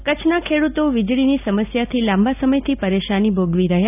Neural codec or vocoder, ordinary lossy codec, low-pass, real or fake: none; none; 3.6 kHz; real